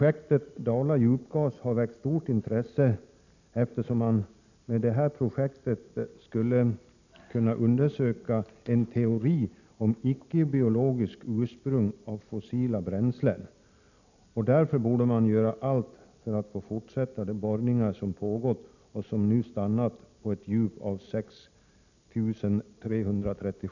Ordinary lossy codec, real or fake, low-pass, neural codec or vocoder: none; real; 7.2 kHz; none